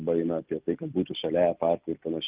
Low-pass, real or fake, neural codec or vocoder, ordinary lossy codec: 3.6 kHz; real; none; Opus, 32 kbps